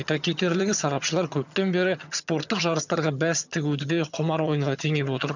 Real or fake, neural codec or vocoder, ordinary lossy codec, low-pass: fake; vocoder, 22.05 kHz, 80 mel bands, HiFi-GAN; none; 7.2 kHz